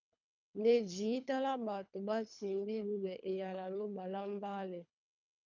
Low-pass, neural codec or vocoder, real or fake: 7.2 kHz; codec, 24 kHz, 3 kbps, HILCodec; fake